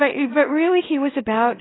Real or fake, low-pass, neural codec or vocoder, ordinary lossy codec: fake; 7.2 kHz; codec, 24 kHz, 0.9 kbps, WavTokenizer, small release; AAC, 16 kbps